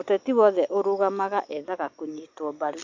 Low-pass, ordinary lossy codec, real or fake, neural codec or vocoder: 7.2 kHz; MP3, 48 kbps; real; none